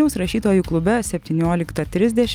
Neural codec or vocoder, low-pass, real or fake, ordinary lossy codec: none; 19.8 kHz; real; Opus, 32 kbps